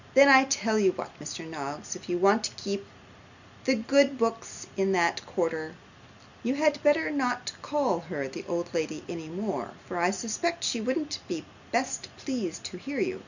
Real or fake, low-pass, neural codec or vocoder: real; 7.2 kHz; none